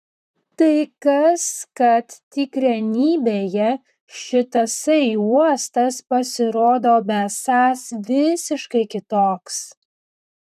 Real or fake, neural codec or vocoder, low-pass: fake; vocoder, 44.1 kHz, 128 mel bands, Pupu-Vocoder; 14.4 kHz